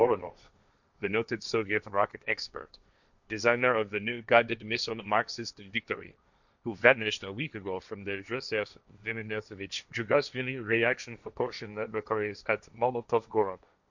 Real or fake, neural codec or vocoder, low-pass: fake; codec, 16 kHz, 1.1 kbps, Voila-Tokenizer; 7.2 kHz